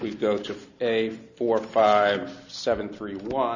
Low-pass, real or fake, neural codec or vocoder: 7.2 kHz; real; none